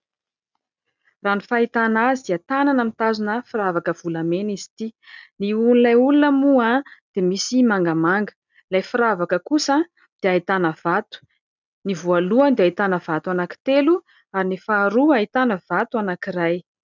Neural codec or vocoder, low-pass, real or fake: none; 7.2 kHz; real